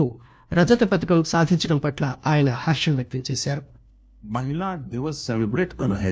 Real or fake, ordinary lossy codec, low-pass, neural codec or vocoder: fake; none; none; codec, 16 kHz, 1 kbps, FunCodec, trained on LibriTTS, 50 frames a second